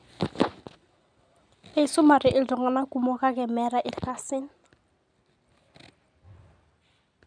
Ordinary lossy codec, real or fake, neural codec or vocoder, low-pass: none; real; none; 9.9 kHz